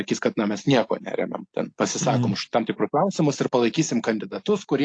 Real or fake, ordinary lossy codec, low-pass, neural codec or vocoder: real; AAC, 48 kbps; 9.9 kHz; none